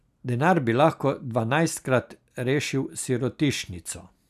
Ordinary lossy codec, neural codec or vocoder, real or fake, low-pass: none; none; real; 14.4 kHz